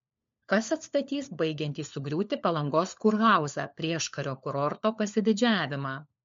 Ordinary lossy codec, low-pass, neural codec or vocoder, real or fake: MP3, 48 kbps; 7.2 kHz; codec, 16 kHz, 4 kbps, FunCodec, trained on LibriTTS, 50 frames a second; fake